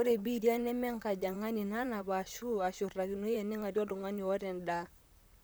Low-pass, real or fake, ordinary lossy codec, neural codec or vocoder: none; fake; none; vocoder, 44.1 kHz, 128 mel bands, Pupu-Vocoder